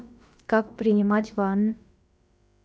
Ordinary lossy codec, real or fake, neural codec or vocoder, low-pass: none; fake; codec, 16 kHz, about 1 kbps, DyCAST, with the encoder's durations; none